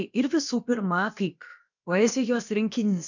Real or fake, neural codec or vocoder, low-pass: fake; codec, 16 kHz, about 1 kbps, DyCAST, with the encoder's durations; 7.2 kHz